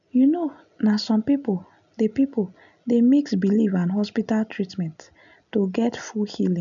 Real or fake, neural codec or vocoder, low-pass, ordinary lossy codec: real; none; 7.2 kHz; none